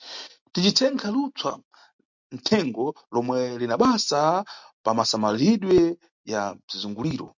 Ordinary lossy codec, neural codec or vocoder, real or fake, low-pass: MP3, 64 kbps; none; real; 7.2 kHz